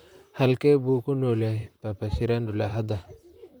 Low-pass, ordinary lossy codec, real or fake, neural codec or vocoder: none; none; fake; vocoder, 44.1 kHz, 128 mel bands, Pupu-Vocoder